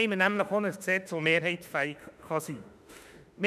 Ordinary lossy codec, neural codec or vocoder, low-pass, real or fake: none; autoencoder, 48 kHz, 32 numbers a frame, DAC-VAE, trained on Japanese speech; 14.4 kHz; fake